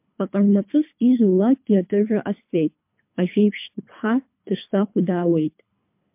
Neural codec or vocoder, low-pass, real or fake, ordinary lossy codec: codec, 24 kHz, 3 kbps, HILCodec; 3.6 kHz; fake; MP3, 32 kbps